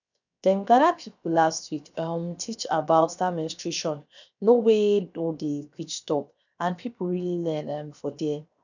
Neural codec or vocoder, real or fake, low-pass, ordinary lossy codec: codec, 16 kHz, 0.7 kbps, FocalCodec; fake; 7.2 kHz; none